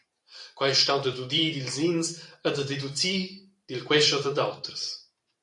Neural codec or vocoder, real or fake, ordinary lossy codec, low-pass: vocoder, 44.1 kHz, 128 mel bands every 512 samples, BigVGAN v2; fake; AAC, 64 kbps; 10.8 kHz